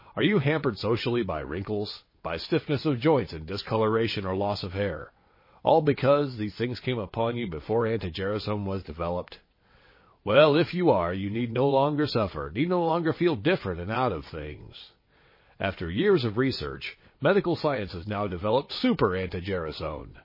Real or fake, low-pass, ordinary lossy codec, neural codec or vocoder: fake; 5.4 kHz; MP3, 24 kbps; vocoder, 22.05 kHz, 80 mel bands, WaveNeXt